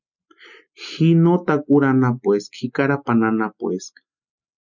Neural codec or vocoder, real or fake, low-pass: none; real; 7.2 kHz